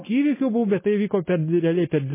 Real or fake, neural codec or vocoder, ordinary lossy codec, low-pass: real; none; MP3, 16 kbps; 3.6 kHz